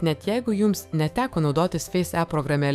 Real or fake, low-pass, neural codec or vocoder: fake; 14.4 kHz; autoencoder, 48 kHz, 128 numbers a frame, DAC-VAE, trained on Japanese speech